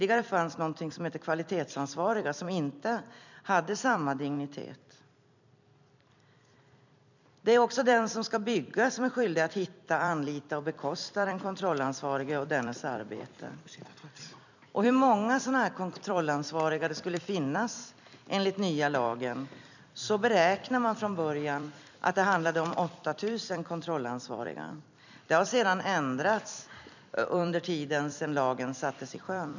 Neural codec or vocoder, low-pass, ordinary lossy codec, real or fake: vocoder, 44.1 kHz, 128 mel bands every 256 samples, BigVGAN v2; 7.2 kHz; none; fake